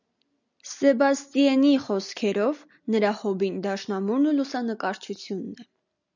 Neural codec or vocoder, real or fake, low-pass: none; real; 7.2 kHz